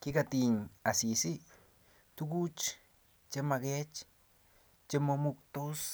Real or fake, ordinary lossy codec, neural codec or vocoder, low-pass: real; none; none; none